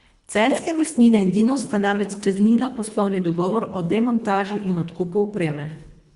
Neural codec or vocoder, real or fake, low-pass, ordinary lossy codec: codec, 24 kHz, 1.5 kbps, HILCodec; fake; 10.8 kHz; Opus, 64 kbps